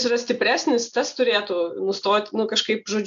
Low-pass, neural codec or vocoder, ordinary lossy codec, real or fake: 7.2 kHz; none; AAC, 64 kbps; real